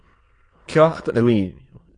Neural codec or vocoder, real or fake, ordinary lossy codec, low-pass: autoencoder, 22.05 kHz, a latent of 192 numbers a frame, VITS, trained on many speakers; fake; AAC, 32 kbps; 9.9 kHz